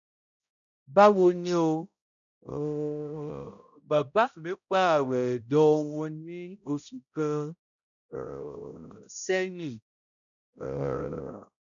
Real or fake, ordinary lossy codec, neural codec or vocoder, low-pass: fake; MP3, 96 kbps; codec, 16 kHz, 0.5 kbps, X-Codec, HuBERT features, trained on balanced general audio; 7.2 kHz